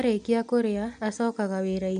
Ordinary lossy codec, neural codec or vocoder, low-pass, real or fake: none; none; 9.9 kHz; real